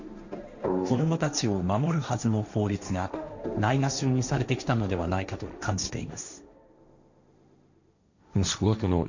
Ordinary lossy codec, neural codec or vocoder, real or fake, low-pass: none; codec, 16 kHz, 1.1 kbps, Voila-Tokenizer; fake; 7.2 kHz